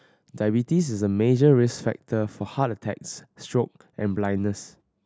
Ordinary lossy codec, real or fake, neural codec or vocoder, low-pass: none; real; none; none